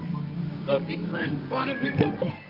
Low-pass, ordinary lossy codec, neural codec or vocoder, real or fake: 5.4 kHz; Opus, 32 kbps; codec, 24 kHz, 0.9 kbps, WavTokenizer, medium music audio release; fake